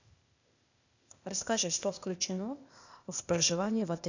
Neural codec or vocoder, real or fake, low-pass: codec, 16 kHz, 0.8 kbps, ZipCodec; fake; 7.2 kHz